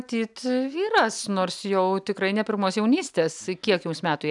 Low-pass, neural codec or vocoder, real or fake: 10.8 kHz; none; real